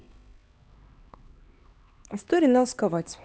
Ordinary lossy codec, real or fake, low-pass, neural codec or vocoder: none; fake; none; codec, 16 kHz, 2 kbps, X-Codec, HuBERT features, trained on LibriSpeech